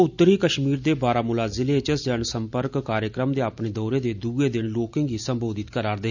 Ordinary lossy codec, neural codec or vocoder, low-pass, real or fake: none; none; 7.2 kHz; real